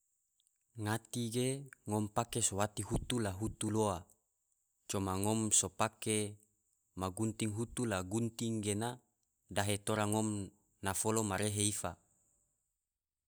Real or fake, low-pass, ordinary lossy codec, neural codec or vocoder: real; none; none; none